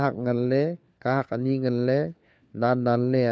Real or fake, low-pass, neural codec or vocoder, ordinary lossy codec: fake; none; codec, 16 kHz, 4 kbps, FunCodec, trained on LibriTTS, 50 frames a second; none